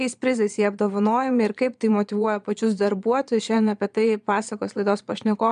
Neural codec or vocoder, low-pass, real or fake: vocoder, 22.05 kHz, 80 mel bands, Vocos; 9.9 kHz; fake